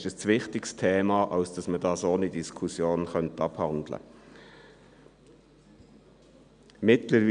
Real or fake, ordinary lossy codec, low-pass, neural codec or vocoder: real; none; 9.9 kHz; none